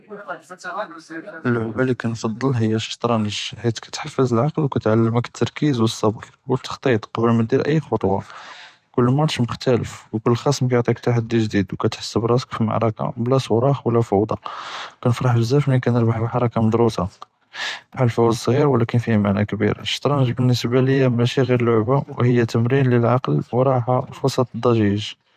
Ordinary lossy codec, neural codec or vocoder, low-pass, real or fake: none; vocoder, 44.1 kHz, 128 mel bands every 512 samples, BigVGAN v2; 14.4 kHz; fake